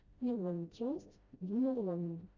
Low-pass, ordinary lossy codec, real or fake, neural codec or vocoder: 7.2 kHz; Opus, 64 kbps; fake; codec, 16 kHz, 0.5 kbps, FreqCodec, smaller model